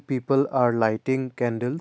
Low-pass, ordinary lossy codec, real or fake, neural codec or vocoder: none; none; real; none